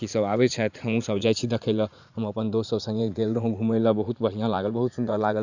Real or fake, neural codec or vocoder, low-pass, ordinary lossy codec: fake; vocoder, 44.1 kHz, 80 mel bands, Vocos; 7.2 kHz; none